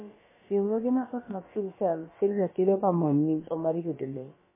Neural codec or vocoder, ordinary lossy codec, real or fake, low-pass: codec, 16 kHz, about 1 kbps, DyCAST, with the encoder's durations; MP3, 16 kbps; fake; 3.6 kHz